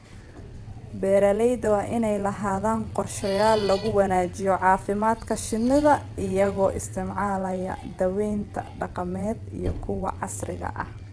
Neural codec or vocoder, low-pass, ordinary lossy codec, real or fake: vocoder, 22.05 kHz, 80 mel bands, WaveNeXt; none; none; fake